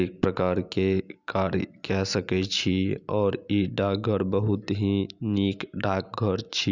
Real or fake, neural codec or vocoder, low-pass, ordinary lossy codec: real; none; 7.2 kHz; Opus, 64 kbps